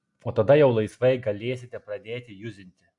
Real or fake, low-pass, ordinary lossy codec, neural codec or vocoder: real; 10.8 kHz; AAC, 64 kbps; none